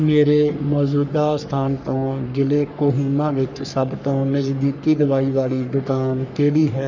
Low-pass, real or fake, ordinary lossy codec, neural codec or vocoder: 7.2 kHz; fake; none; codec, 44.1 kHz, 3.4 kbps, Pupu-Codec